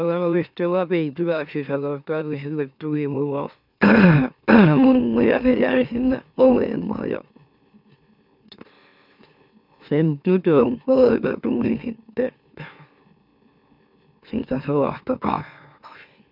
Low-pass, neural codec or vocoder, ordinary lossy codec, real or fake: 5.4 kHz; autoencoder, 44.1 kHz, a latent of 192 numbers a frame, MeloTTS; none; fake